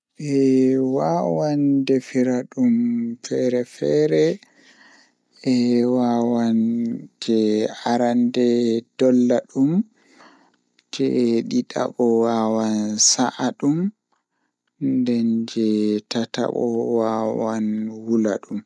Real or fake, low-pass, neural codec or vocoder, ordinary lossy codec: real; none; none; none